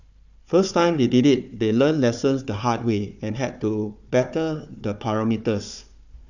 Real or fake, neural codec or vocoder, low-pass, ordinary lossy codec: fake; codec, 16 kHz, 4 kbps, FunCodec, trained on Chinese and English, 50 frames a second; 7.2 kHz; none